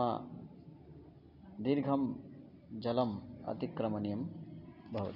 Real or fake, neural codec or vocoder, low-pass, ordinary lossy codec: real; none; 5.4 kHz; none